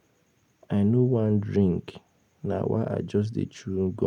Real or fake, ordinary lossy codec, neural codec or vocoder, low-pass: real; none; none; 19.8 kHz